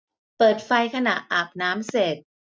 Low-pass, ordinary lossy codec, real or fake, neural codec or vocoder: none; none; real; none